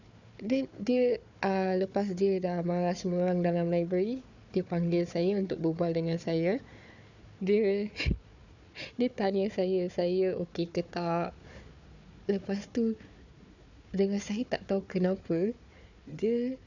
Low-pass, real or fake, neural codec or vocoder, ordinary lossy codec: 7.2 kHz; fake; codec, 16 kHz, 4 kbps, FunCodec, trained on Chinese and English, 50 frames a second; none